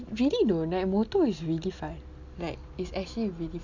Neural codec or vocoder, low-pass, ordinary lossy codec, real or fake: none; 7.2 kHz; none; real